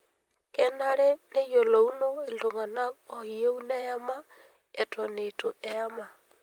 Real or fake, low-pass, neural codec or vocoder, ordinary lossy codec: fake; 19.8 kHz; vocoder, 44.1 kHz, 128 mel bands, Pupu-Vocoder; Opus, 32 kbps